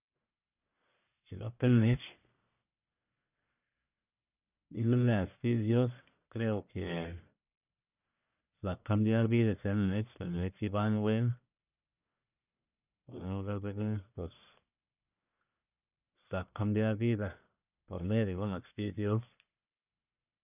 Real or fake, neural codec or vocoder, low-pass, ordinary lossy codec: fake; codec, 44.1 kHz, 1.7 kbps, Pupu-Codec; 3.6 kHz; none